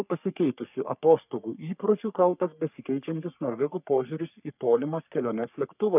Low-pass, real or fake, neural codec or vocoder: 3.6 kHz; fake; codec, 44.1 kHz, 3.4 kbps, Pupu-Codec